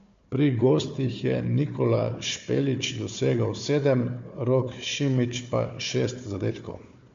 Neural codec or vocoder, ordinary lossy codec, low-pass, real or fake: codec, 16 kHz, 4 kbps, FunCodec, trained on Chinese and English, 50 frames a second; MP3, 48 kbps; 7.2 kHz; fake